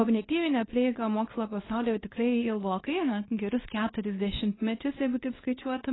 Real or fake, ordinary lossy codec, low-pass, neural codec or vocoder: fake; AAC, 16 kbps; 7.2 kHz; codec, 24 kHz, 0.9 kbps, WavTokenizer, medium speech release version 2